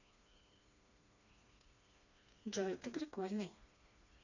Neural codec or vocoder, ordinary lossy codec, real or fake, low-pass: codec, 16 kHz, 2 kbps, FreqCodec, smaller model; none; fake; 7.2 kHz